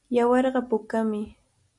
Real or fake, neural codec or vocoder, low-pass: real; none; 10.8 kHz